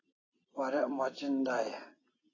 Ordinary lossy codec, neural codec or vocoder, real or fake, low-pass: AAC, 32 kbps; none; real; 7.2 kHz